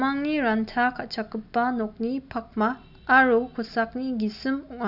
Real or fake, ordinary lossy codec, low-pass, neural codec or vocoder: real; none; 5.4 kHz; none